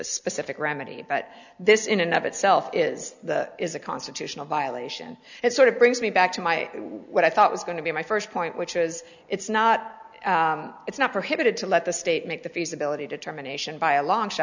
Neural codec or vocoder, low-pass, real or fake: none; 7.2 kHz; real